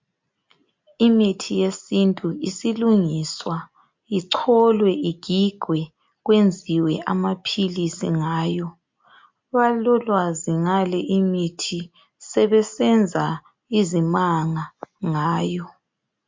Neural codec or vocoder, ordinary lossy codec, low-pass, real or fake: none; MP3, 48 kbps; 7.2 kHz; real